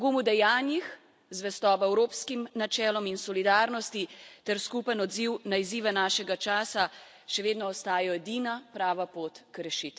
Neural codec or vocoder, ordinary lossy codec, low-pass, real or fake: none; none; none; real